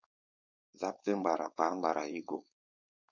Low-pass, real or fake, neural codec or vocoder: 7.2 kHz; fake; codec, 16 kHz, 4.8 kbps, FACodec